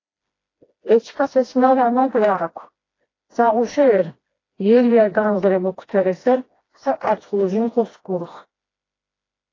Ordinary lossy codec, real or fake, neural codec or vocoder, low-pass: AAC, 32 kbps; fake; codec, 16 kHz, 1 kbps, FreqCodec, smaller model; 7.2 kHz